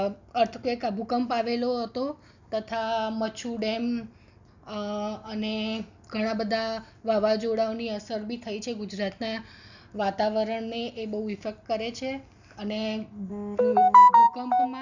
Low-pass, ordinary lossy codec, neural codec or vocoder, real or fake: 7.2 kHz; none; none; real